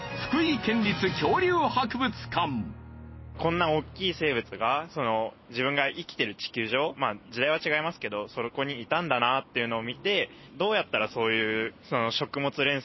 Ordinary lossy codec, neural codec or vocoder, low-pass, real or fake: MP3, 24 kbps; none; 7.2 kHz; real